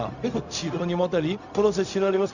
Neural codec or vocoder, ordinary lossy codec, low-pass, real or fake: codec, 16 kHz, 0.4 kbps, LongCat-Audio-Codec; none; 7.2 kHz; fake